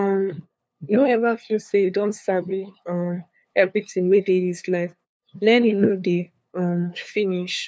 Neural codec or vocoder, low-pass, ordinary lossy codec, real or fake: codec, 16 kHz, 2 kbps, FunCodec, trained on LibriTTS, 25 frames a second; none; none; fake